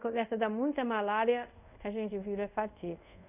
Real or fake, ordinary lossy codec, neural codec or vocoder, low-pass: fake; none; codec, 24 kHz, 0.5 kbps, DualCodec; 3.6 kHz